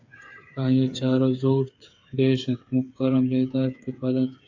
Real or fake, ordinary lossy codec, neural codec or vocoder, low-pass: fake; AAC, 48 kbps; codec, 16 kHz, 8 kbps, FreqCodec, smaller model; 7.2 kHz